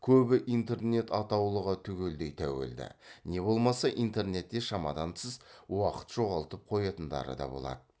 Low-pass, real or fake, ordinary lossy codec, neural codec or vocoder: none; real; none; none